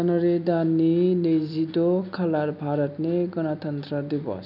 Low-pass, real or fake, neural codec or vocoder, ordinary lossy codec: 5.4 kHz; real; none; none